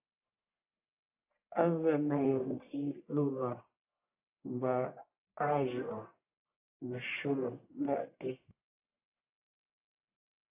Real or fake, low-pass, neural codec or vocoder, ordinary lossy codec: fake; 3.6 kHz; codec, 44.1 kHz, 1.7 kbps, Pupu-Codec; AAC, 32 kbps